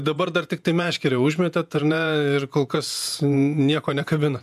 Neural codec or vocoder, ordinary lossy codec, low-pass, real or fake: vocoder, 48 kHz, 128 mel bands, Vocos; MP3, 96 kbps; 14.4 kHz; fake